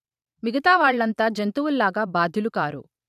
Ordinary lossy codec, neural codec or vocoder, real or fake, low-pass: none; vocoder, 44.1 kHz, 128 mel bands every 512 samples, BigVGAN v2; fake; 14.4 kHz